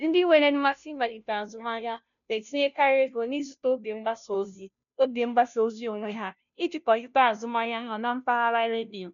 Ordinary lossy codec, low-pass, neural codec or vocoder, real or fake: none; 7.2 kHz; codec, 16 kHz, 0.5 kbps, FunCodec, trained on Chinese and English, 25 frames a second; fake